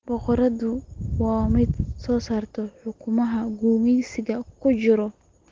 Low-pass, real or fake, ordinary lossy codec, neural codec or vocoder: 7.2 kHz; real; Opus, 16 kbps; none